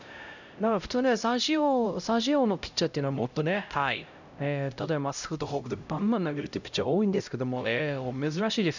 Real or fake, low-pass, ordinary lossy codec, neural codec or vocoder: fake; 7.2 kHz; none; codec, 16 kHz, 0.5 kbps, X-Codec, HuBERT features, trained on LibriSpeech